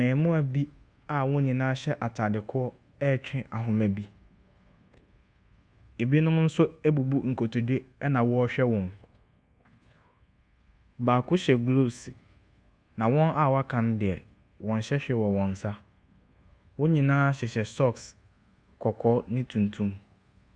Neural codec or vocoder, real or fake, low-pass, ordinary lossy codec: codec, 24 kHz, 1.2 kbps, DualCodec; fake; 9.9 kHz; Opus, 64 kbps